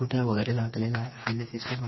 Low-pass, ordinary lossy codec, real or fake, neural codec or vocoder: 7.2 kHz; MP3, 24 kbps; fake; codec, 16 kHz, 4 kbps, FreqCodec, smaller model